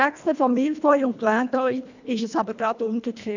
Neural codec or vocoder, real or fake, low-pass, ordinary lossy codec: codec, 24 kHz, 1.5 kbps, HILCodec; fake; 7.2 kHz; none